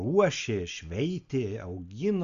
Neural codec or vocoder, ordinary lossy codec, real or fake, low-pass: none; Opus, 64 kbps; real; 7.2 kHz